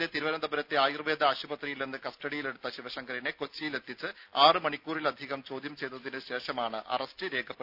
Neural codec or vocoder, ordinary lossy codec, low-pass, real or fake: none; none; 5.4 kHz; real